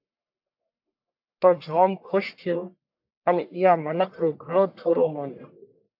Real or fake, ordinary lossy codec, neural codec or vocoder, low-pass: fake; AAC, 48 kbps; codec, 44.1 kHz, 1.7 kbps, Pupu-Codec; 5.4 kHz